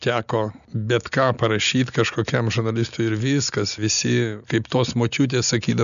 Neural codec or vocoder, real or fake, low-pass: none; real; 7.2 kHz